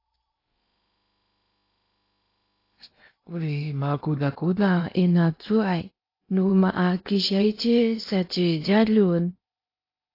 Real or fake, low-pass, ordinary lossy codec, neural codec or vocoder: fake; 5.4 kHz; AAC, 32 kbps; codec, 16 kHz in and 24 kHz out, 0.8 kbps, FocalCodec, streaming, 65536 codes